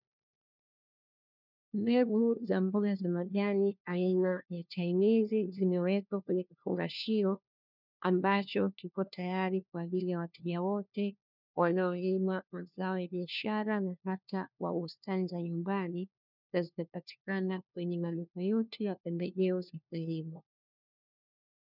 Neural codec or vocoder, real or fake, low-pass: codec, 16 kHz, 1 kbps, FunCodec, trained on LibriTTS, 50 frames a second; fake; 5.4 kHz